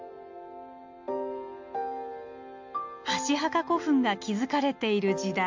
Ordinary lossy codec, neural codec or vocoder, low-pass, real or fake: MP3, 48 kbps; none; 7.2 kHz; real